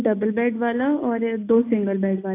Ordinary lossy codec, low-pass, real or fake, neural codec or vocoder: AAC, 24 kbps; 3.6 kHz; real; none